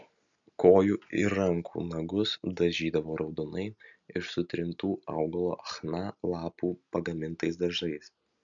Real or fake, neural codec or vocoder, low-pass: real; none; 7.2 kHz